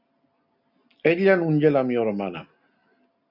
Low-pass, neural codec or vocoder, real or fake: 5.4 kHz; none; real